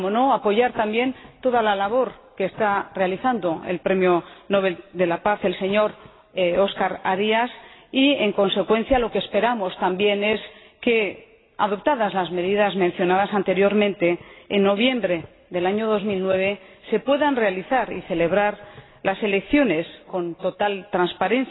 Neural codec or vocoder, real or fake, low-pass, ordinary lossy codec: none; real; 7.2 kHz; AAC, 16 kbps